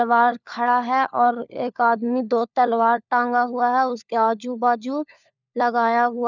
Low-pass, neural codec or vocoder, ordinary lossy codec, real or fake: 7.2 kHz; codec, 16 kHz, 4 kbps, FunCodec, trained on LibriTTS, 50 frames a second; none; fake